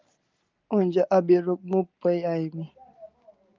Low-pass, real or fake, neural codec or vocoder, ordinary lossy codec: 7.2 kHz; real; none; Opus, 24 kbps